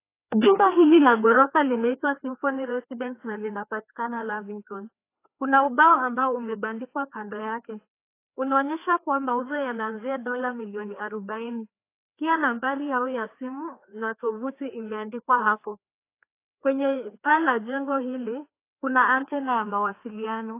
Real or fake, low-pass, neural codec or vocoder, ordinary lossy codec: fake; 3.6 kHz; codec, 16 kHz, 2 kbps, FreqCodec, larger model; AAC, 24 kbps